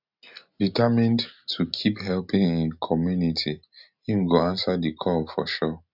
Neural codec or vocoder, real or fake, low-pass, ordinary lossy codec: none; real; 5.4 kHz; none